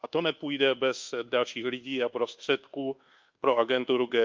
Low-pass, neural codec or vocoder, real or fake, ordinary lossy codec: 7.2 kHz; codec, 24 kHz, 1.2 kbps, DualCodec; fake; Opus, 24 kbps